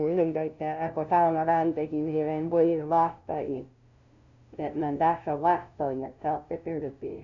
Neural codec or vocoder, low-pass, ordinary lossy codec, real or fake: codec, 16 kHz, 0.5 kbps, FunCodec, trained on LibriTTS, 25 frames a second; 7.2 kHz; none; fake